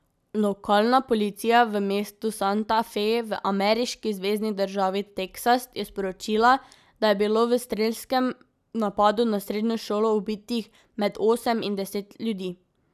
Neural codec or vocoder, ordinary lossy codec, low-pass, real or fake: none; none; 14.4 kHz; real